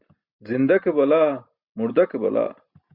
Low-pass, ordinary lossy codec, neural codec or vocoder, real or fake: 5.4 kHz; Opus, 64 kbps; none; real